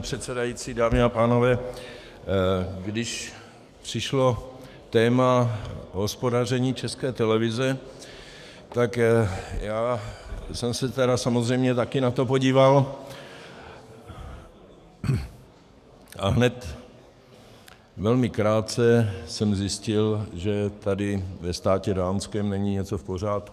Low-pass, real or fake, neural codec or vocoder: 14.4 kHz; fake; codec, 44.1 kHz, 7.8 kbps, DAC